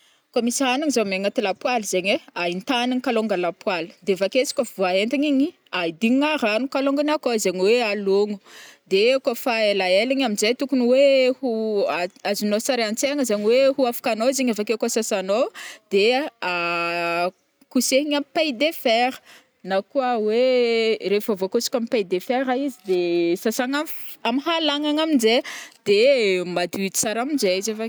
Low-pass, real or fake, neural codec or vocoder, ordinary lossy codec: none; real; none; none